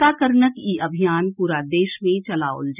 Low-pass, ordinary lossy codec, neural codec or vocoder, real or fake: 3.6 kHz; none; none; real